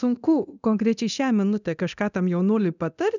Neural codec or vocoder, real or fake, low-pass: codec, 24 kHz, 0.9 kbps, DualCodec; fake; 7.2 kHz